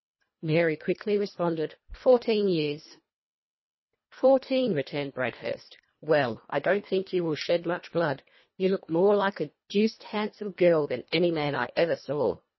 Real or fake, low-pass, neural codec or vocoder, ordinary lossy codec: fake; 7.2 kHz; codec, 24 kHz, 1.5 kbps, HILCodec; MP3, 24 kbps